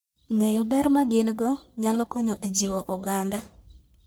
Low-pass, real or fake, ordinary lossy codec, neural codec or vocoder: none; fake; none; codec, 44.1 kHz, 1.7 kbps, Pupu-Codec